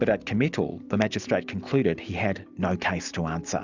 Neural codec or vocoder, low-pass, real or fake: none; 7.2 kHz; real